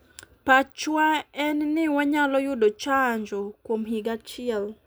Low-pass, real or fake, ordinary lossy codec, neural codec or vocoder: none; real; none; none